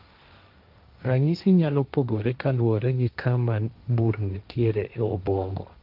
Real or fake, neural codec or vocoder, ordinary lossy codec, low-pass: fake; codec, 16 kHz, 1.1 kbps, Voila-Tokenizer; Opus, 32 kbps; 5.4 kHz